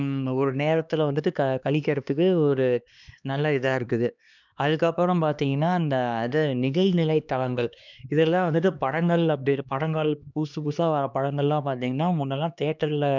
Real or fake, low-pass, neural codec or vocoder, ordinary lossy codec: fake; 7.2 kHz; codec, 16 kHz, 2 kbps, X-Codec, HuBERT features, trained on LibriSpeech; none